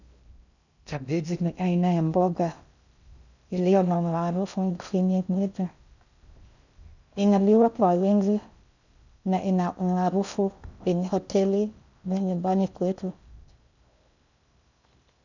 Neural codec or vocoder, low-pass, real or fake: codec, 16 kHz in and 24 kHz out, 0.6 kbps, FocalCodec, streaming, 2048 codes; 7.2 kHz; fake